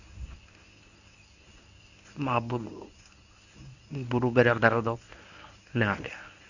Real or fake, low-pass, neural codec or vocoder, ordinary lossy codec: fake; 7.2 kHz; codec, 24 kHz, 0.9 kbps, WavTokenizer, medium speech release version 1; none